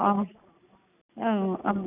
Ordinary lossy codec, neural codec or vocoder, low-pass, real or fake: none; none; 3.6 kHz; real